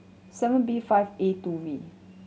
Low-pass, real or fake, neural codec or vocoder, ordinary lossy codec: none; real; none; none